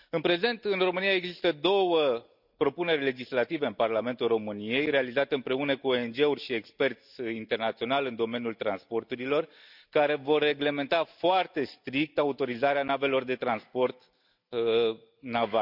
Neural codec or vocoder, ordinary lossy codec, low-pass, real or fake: none; none; 5.4 kHz; real